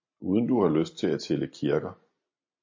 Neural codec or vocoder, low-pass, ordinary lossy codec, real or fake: none; 7.2 kHz; MP3, 32 kbps; real